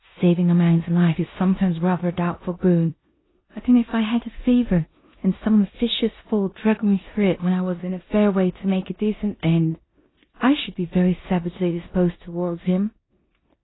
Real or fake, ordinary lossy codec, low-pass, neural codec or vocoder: fake; AAC, 16 kbps; 7.2 kHz; codec, 16 kHz in and 24 kHz out, 0.9 kbps, LongCat-Audio-Codec, four codebook decoder